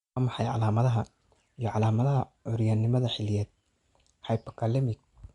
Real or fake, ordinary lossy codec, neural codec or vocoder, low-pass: real; Opus, 64 kbps; none; 10.8 kHz